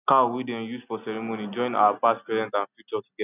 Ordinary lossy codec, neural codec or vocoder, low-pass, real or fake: none; none; 3.6 kHz; real